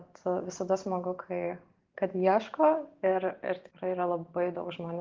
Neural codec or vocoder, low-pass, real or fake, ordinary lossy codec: none; 7.2 kHz; real; Opus, 16 kbps